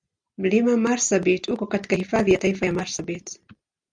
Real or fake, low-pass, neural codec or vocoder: real; 9.9 kHz; none